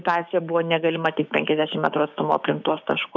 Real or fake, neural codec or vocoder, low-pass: fake; codec, 16 kHz, 6 kbps, DAC; 7.2 kHz